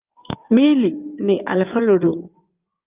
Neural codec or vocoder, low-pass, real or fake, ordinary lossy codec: codec, 16 kHz in and 24 kHz out, 2.2 kbps, FireRedTTS-2 codec; 3.6 kHz; fake; Opus, 24 kbps